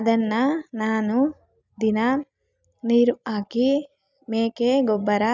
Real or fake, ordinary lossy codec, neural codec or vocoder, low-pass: real; none; none; 7.2 kHz